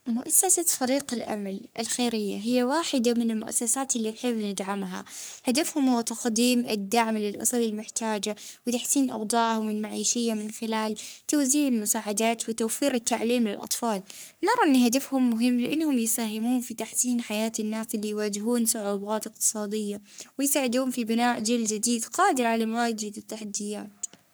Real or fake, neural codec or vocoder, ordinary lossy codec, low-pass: fake; codec, 44.1 kHz, 3.4 kbps, Pupu-Codec; none; none